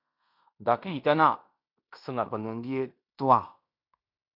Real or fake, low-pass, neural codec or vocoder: fake; 5.4 kHz; codec, 16 kHz in and 24 kHz out, 0.9 kbps, LongCat-Audio-Codec, fine tuned four codebook decoder